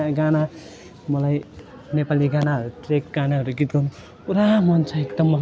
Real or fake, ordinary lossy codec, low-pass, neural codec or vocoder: real; none; none; none